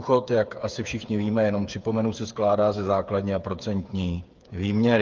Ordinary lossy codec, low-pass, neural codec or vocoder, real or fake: Opus, 24 kbps; 7.2 kHz; codec, 16 kHz, 8 kbps, FreqCodec, smaller model; fake